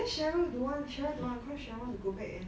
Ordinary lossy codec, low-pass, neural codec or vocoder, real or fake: none; none; none; real